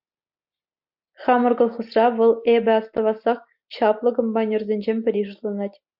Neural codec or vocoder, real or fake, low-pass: none; real; 5.4 kHz